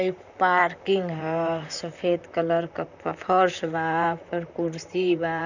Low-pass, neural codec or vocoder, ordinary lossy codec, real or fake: 7.2 kHz; vocoder, 44.1 kHz, 128 mel bands, Pupu-Vocoder; none; fake